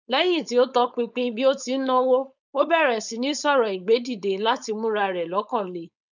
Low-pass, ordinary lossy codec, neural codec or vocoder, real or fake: 7.2 kHz; none; codec, 16 kHz, 4.8 kbps, FACodec; fake